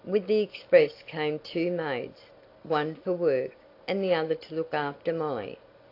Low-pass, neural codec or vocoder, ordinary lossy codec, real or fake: 5.4 kHz; none; AAC, 32 kbps; real